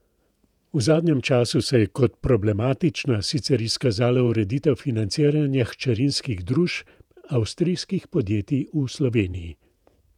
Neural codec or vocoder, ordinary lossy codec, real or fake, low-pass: vocoder, 48 kHz, 128 mel bands, Vocos; none; fake; 19.8 kHz